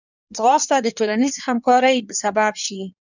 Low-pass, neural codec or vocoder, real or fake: 7.2 kHz; codec, 16 kHz in and 24 kHz out, 1.1 kbps, FireRedTTS-2 codec; fake